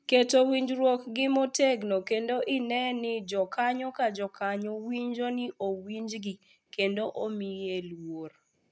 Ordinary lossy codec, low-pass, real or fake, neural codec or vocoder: none; none; real; none